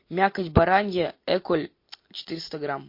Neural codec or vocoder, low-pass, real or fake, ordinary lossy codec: none; 5.4 kHz; real; MP3, 32 kbps